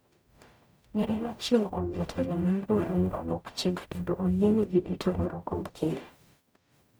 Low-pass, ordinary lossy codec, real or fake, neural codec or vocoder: none; none; fake; codec, 44.1 kHz, 0.9 kbps, DAC